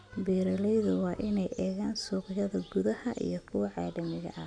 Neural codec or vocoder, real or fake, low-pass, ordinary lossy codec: none; real; 9.9 kHz; none